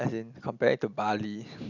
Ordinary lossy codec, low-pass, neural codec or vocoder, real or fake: none; 7.2 kHz; none; real